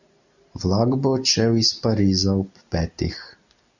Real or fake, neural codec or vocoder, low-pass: real; none; 7.2 kHz